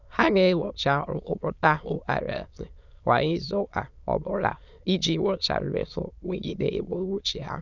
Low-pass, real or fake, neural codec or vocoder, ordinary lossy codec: 7.2 kHz; fake; autoencoder, 22.05 kHz, a latent of 192 numbers a frame, VITS, trained on many speakers; none